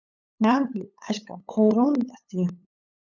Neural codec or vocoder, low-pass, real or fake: codec, 16 kHz, 8 kbps, FunCodec, trained on LibriTTS, 25 frames a second; 7.2 kHz; fake